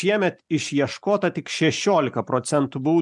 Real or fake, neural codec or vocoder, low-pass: real; none; 10.8 kHz